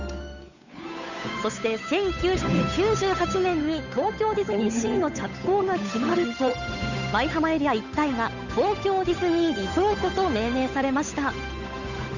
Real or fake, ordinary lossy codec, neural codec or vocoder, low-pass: fake; none; codec, 16 kHz, 8 kbps, FunCodec, trained on Chinese and English, 25 frames a second; 7.2 kHz